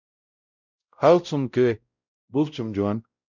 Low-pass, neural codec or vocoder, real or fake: 7.2 kHz; codec, 16 kHz, 0.5 kbps, X-Codec, WavLM features, trained on Multilingual LibriSpeech; fake